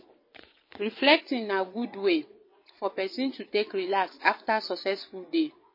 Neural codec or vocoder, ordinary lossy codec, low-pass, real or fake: vocoder, 22.05 kHz, 80 mel bands, WaveNeXt; MP3, 24 kbps; 5.4 kHz; fake